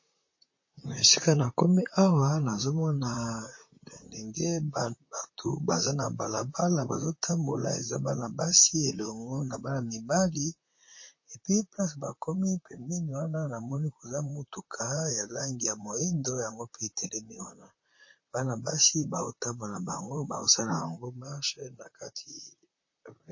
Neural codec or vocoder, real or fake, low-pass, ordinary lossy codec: vocoder, 44.1 kHz, 128 mel bands, Pupu-Vocoder; fake; 7.2 kHz; MP3, 32 kbps